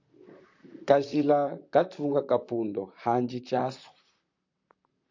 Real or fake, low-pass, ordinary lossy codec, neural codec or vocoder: fake; 7.2 kHz; AAC, 48 kbps; vocoder, 44.1 kHz, 128 mel bands, Pupu-Vocoder